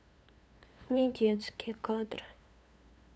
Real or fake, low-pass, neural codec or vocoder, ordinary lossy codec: fake; none; codec, 16 kHz, 2 kbps, FunCodec, trained on LibriTTS, 25 frames a second; none